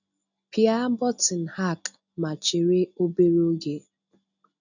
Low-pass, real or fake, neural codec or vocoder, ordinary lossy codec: 7.2 kHz; real; none; none